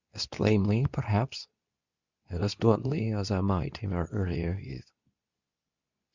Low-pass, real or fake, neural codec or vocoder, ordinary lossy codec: 7.2 kHz; fake; codec, 24 kHz, 0.9 kbps, WavTokenizer, medium speech release version 2; Opus, 64 kbps